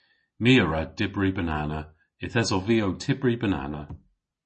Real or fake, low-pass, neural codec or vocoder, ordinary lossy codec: real; 10.8 kHz; none; MP3, 32 kbps